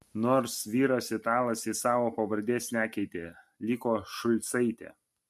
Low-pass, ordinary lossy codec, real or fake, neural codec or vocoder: 14.4 kHz; MP3, 64 kbps; real; none